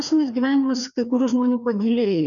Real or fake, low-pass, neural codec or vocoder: fake; 7.2 kHz; codec, 16 kHz, 2 kbps, FreqCodec, larger model